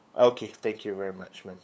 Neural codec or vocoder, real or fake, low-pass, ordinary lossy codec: codec, 16 kHz, 8 kbps, FunCodec, trained on LibriTTS, 25 frames a second; fake; none; none